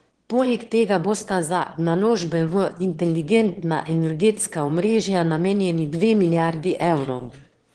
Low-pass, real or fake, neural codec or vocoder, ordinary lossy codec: 9.9 kHz; fake; autoencoder, 22.05 kHz, a latent of 192 numbers a frame, VITS, trained on one speaker; Opus, 16 kbps